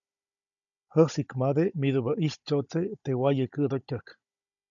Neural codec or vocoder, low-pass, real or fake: codec, 16 kHz, 16 kbps, FunCodec, trained on Chinese and English, 50 frames a second; 7.2 kHz; fake